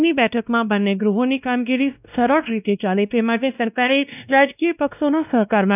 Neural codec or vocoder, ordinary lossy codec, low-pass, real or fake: codec, 16 kHz, 1 kbps, X-Codec, WavLM features, trained on Multilingual LibriSpeech; none; 3.6 kHz; fake